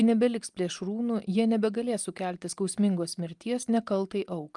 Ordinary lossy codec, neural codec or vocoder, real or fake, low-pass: Opus, 32 kbps; none; real; 10.8 kHz